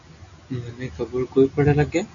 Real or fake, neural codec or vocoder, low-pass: real; none; 7.2 kHz